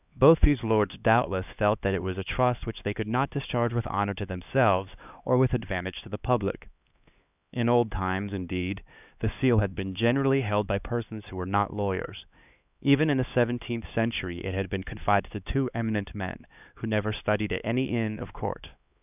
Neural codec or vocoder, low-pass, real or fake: codec, 16 kHz, 2 kbps, X-Codec, HuBERT features, trained on LibriSpeech; 3.6 kHz; fake